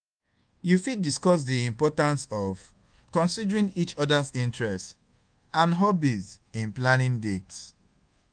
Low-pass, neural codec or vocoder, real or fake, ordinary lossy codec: 9.9 kHz; codec, 24 kHz, 1.2 kbps, DualCodec; fake; Opus, 32 kbps